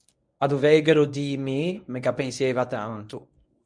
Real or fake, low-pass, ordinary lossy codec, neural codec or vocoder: fake; 9.9 kHz; MP3, 96 kbps; codec, 24 kHz, 0.9 kbps, WavTokenizer, medium speech release version 1